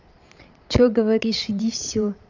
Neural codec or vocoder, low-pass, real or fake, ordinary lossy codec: vocoder, 22.05 kHz, 80 mel bands, Vocos; 7.2 kHz; fake; none